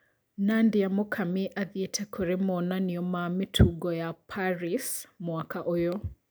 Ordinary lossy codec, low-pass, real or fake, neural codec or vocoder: none; none; real; none